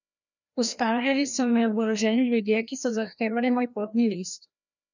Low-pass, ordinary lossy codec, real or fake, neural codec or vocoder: 7.2 kHz; none; fake; codec, 16 kHz, 1 kbps, FreqCodec, larger model